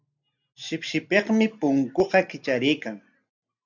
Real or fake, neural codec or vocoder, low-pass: real; none; 7.2 kHz